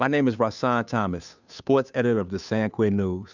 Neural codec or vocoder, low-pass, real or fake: codec, 16 kHz, 2 kbps, FunCodec, trained on Chinese and English, 25 frames a second; 7.2 kHz; fake